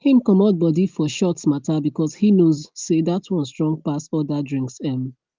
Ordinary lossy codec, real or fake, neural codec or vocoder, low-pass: Opus, 32 kbps; real; none; 7.2 kHz